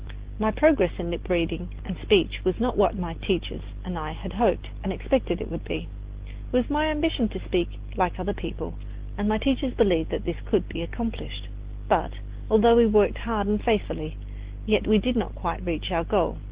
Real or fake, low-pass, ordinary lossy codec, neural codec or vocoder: real; 3.6 kHz; Opus, 16 kbps; none